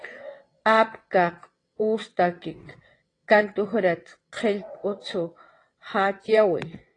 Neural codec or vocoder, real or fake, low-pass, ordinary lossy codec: vocoder, 22.05 kHz, 80 mel bands, WaveNeXt; fake; 9.9 kHz; AAC, 32 kbps